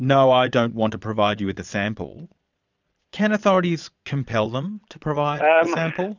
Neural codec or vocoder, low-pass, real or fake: vocoder, 22.05 kHz, 80 mel bands, Vocos; 7.2 kHz; fake